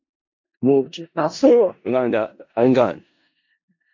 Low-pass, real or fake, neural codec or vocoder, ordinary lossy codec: 7.2 kHz; fake; codec, 16 kHz in and 24 kHz out, 0.4 kbps, LongCat-Audio-Codec, four codebook decoder; MP3, 48 kbps